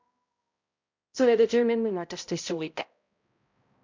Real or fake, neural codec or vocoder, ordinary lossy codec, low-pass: fake; codec, 16 kHz, 0.5 kbps, X-Codec, HuBERT features, trained on balanced general audio; MP3, 64 kbps; 7.2 kHz